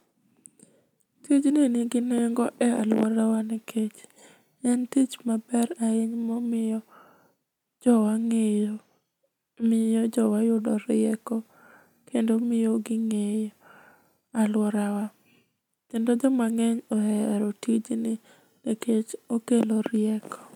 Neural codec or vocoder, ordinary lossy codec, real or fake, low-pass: none; none; real; 19.8 kHz